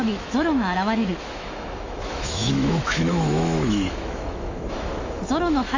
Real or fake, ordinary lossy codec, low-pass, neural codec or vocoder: fake; AAC, 48 kbps; 7.2 kHz; autoencoder, 48 kHz, 128 numbers a frame, DAC-VAE, trained on Japanese speech